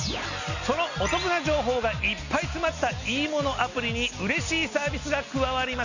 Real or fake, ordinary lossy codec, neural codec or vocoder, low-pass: real; none; none; 7.2 kHz